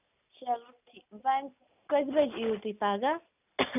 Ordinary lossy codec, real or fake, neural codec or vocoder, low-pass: none; real; none; 3.6 kHz